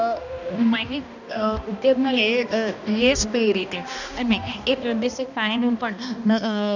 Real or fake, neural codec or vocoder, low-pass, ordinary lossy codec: fake; codec, 16 kHz, 1 kbps, X-Codec, HuBERT features, trained on balanced general audio; 7.2 kHz; none